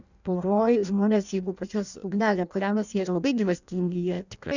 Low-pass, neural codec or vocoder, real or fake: 7.2 kHz; codec, 16 kHz in and 24 kHz out, 0.6 kbps, FireRedTTS-2 codec; fake